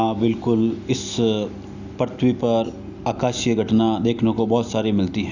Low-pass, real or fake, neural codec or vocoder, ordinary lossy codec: 7.2 kHz; real; none; none